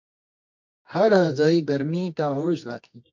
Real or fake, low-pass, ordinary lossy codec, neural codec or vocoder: fake; 7.2 kHz; MP3, 48 kbps; codec, 24 kHz, 0.9 kbps, WavTokenizer, medium music audio release